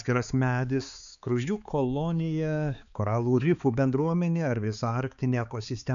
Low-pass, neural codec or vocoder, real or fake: 7.2 kHz; codec, 16 kHz, 4 kbps, X-Codec, HuBERT features, trained on balanced general audio; fake